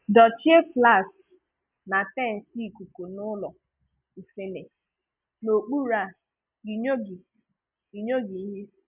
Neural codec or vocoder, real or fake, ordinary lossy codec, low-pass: none; real; none; 3.6 kHz